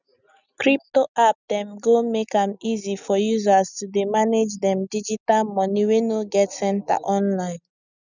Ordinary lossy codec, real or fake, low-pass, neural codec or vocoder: none; real; 7.2 kHz; none